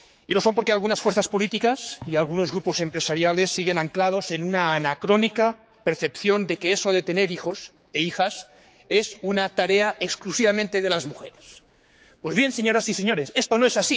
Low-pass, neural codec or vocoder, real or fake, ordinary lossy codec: none; codec, 16 kHz, 4 kbps, X-Codec, HuBERT features, trained on general audio; fake; none